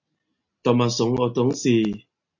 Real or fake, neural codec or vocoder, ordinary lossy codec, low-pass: real; none; MP3, 48 kbps; 7.2 kHz